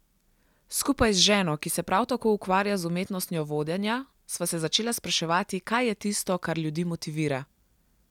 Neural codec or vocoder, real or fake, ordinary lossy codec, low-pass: none; real; none; 19.8 kHz